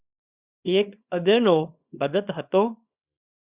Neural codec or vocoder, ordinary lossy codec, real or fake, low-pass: codec, 24 kHz, 0.9 kbps, WavTokenizer, small release; Opus, 64 kbps; fake; 3.6 kHz